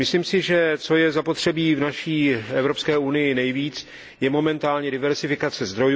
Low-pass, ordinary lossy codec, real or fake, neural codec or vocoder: none; none; real; none